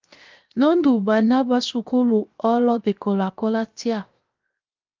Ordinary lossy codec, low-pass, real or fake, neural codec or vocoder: Opus, 24 kbps; 7.2 kHz; fake; codec, 16 kHz, 0.7 kbps, FocalCodec